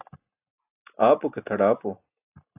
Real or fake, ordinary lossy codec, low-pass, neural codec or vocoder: real; AAC, 32 kbps; 3.6 kHz; none